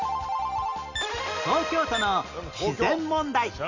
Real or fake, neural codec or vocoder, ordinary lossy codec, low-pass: real; none; Opus, 64 kbps; 7.2 kHz